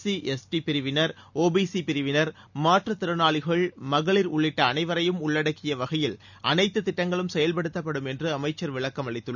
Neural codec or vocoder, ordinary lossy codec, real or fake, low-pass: none; none; real; 7.2 kHz